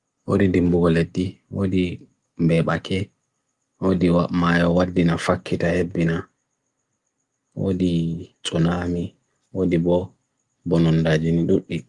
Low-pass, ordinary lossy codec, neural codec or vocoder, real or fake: 10.8 kHz; Opus, 32 kbps; none; real